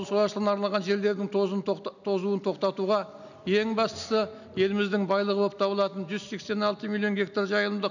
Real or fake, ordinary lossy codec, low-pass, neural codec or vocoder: real; none; 7.2 kHz; none